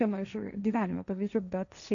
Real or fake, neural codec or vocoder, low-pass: fake; codec, 16 kHz, 1.1 kbps, Voila-Tokenizer; 7.2 kHz